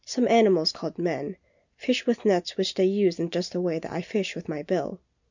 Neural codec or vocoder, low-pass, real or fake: none; 7.2 kHz; real